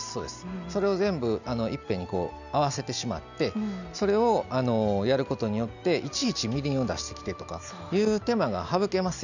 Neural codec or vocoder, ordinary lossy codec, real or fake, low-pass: none; none; real; 7.2 kHz